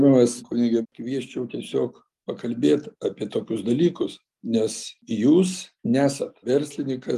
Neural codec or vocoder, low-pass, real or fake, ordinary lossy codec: none; 14.4 kHz; real; Opus, 32 kbps